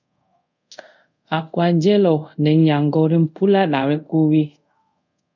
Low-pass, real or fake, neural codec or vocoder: 7.2 kHz; fake; codec, 24 kHz, 0.5 kbps, DualCodec